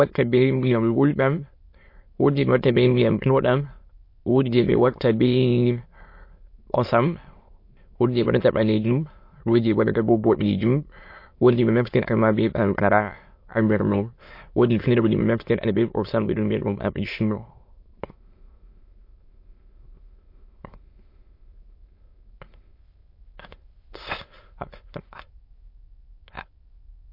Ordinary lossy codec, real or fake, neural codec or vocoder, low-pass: MP3, 32 kbps; fake; autoencoder, 22.05 kHz, a latent of 192 numbers a frame, VITS, trained on many speakers; 5.4 kHz